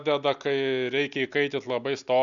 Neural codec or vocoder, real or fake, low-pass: none; real; 7.2 kHz